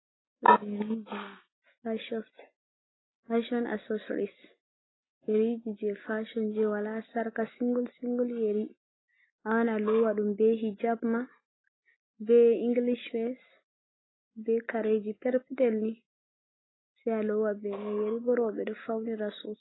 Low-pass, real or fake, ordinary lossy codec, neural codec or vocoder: 7.2 kHz; real; AAC, 16 kbps; none